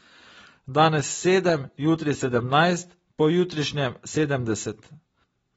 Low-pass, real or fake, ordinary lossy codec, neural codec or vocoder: 19.8 kHz; real; AAC, 24 kbps; none